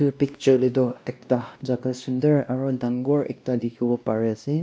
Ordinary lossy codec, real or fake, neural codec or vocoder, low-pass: none; fake; codec, 16 kHz, 1 kbps, X-Codec, WavLM features, trained on Multilingual LibriSpeech; none